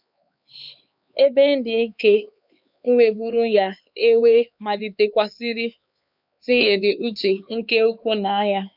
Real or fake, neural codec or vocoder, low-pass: fake; codec, 16 kHz, 4 kbps, X-Codec, HuBERT features, trained on LibriSpeech; 5.4 kHz